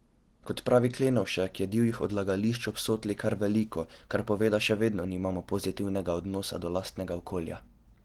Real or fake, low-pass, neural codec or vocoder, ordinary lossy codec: real; 19.8 kHz; none; Opus, 16 kbps